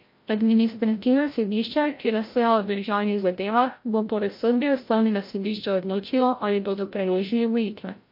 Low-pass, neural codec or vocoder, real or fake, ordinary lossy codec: 5.4 kHz; codec, 16 kHz, 0.5 kbps, FreqCodec, larger model; fake; MP3, 32 kbps